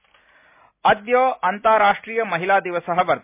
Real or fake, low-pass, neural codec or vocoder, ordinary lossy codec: real; 3.6 kHz; none; MP3, 32 kbps